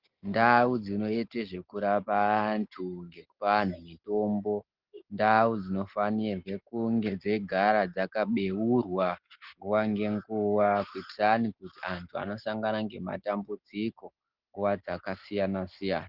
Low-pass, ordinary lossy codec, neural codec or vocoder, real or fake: 5.4 kHz; Opus, 16 kbps; none; real